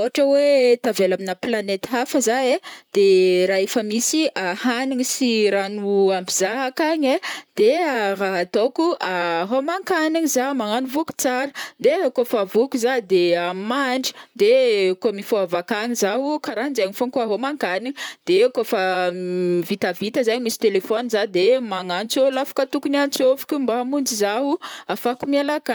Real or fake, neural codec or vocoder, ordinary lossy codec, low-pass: fake; vocoder, 44.1 kHz, 128 mel bands, Pupu-Vocoder; none; none